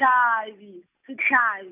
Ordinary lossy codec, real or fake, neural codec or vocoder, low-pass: none; real; none; 3.6 kHz